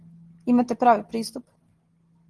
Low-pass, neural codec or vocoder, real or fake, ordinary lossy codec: 10.8 kHz; none; real; Opus, 16 kbps